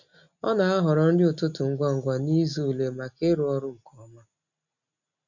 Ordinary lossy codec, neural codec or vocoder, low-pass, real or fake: none; none; 7.2 kHz; real